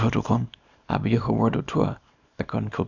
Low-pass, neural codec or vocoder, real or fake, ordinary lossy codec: 7.2 kHz; codec, 24 kHz, 0.9 kbps, WavTokenizer, small release; fake; none